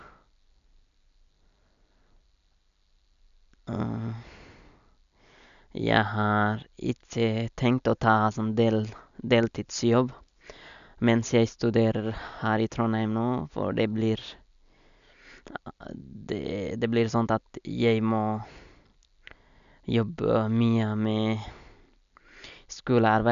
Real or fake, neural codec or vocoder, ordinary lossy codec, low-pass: real; none; none; 7.2 kHz